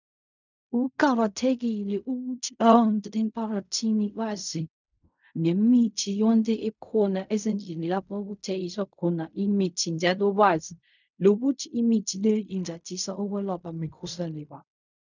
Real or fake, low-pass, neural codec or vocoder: fake; 7.2 kHz; codec, 16 kHz in and 24 kHz out, 0.4 kbps, LongCat-Audio-Codec, fine tuned four codebook decoder